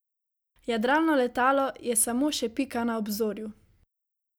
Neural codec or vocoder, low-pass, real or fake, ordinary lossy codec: none; none; real; none